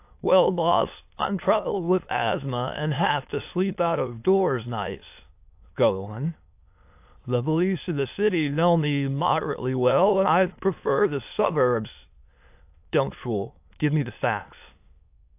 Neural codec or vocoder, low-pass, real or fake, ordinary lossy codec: autoencoder, 22.05 kHz, a latent of 192 numbers a frame, VITS, trained on many speakers; 3.6 kHz; fake; AAC, 32 kbps